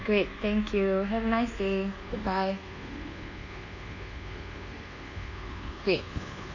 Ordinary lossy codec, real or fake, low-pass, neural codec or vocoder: none; fake; 7.2 kHz; codec, 24 kHz, 1.2 kbps, DualCodec